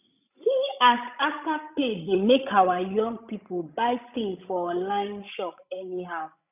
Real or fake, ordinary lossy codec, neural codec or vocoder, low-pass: fake; none; codec, 16 kHz, 16 kbps, FreqCodec, larger model; 3.6 kHz